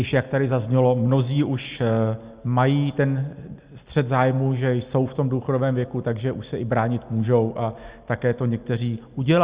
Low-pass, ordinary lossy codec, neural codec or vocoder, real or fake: 3.6 kHz; Opus, 64 kbps; none; real